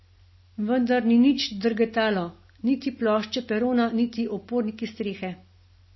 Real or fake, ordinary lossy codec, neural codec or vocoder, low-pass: real; MP3, 24 kbps; none; 7.2 kHz